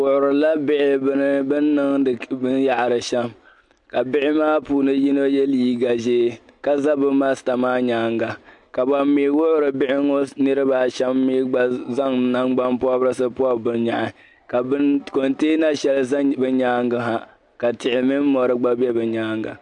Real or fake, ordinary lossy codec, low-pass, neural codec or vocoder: real; MP3, 64 kbps; 10.8 kHz; none